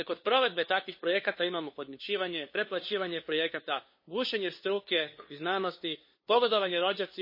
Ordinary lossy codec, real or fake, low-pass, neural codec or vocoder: MP3, 24 kbps; fake; 5.4 kHz; codec, 16 kHz, 2 kbps, FunCodec, trained on LibriTTS, 25 frames a second